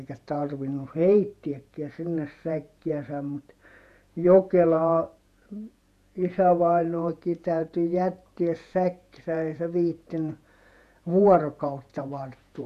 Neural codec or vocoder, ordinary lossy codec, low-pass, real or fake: vocoder, 48 kHz, 128 mel bands, Vocos; none; 14.4 kHz; fake